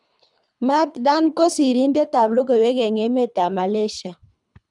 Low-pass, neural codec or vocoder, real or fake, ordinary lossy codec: 10.8 kHz; codec, 24 kHz, 3 kbps, HILCodec; fake; none